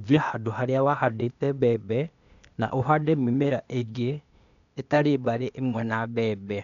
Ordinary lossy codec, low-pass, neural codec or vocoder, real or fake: none; 7.2 kHz; codec, 16 kHz, 0.8 kbps, ZipCodec; fake